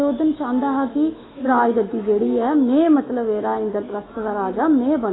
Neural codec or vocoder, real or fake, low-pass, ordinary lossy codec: autoencoder, 48 kHz, 128 numbers a frame, DAC-VAE, trained on Japanese speech; fake; 7.2 kHz; AAC, 16 kbps